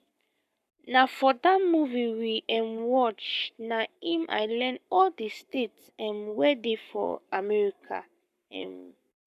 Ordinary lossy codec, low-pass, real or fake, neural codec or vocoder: none; 14.4 kHz; fake; codec, 44.1 kHz, 7.8 kbps, Pupu-Codec